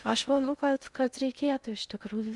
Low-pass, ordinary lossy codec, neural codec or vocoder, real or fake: 10.8 kHz; Opus, 64 kbps; codec, 16 kHz in and 24 kHz out, 0.6 kbps, FocalCodec, streaming, 2048 codes; fake